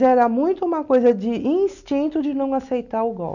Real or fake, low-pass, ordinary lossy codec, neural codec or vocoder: real; 7.2 kHz; none; none